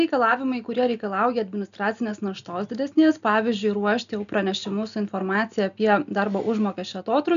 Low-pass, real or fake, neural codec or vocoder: 7.2 kHz; real; none